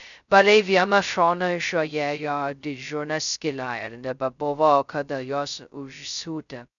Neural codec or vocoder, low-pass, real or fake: codec, 16 kHz, 0.2 kbps, FocalCodec; 7.2 kHz; fake